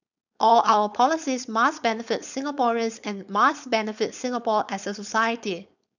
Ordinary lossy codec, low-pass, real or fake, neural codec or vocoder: none; 7.2 kHz; fake; codec, 16 kHz, 4.8 kbps, FACodec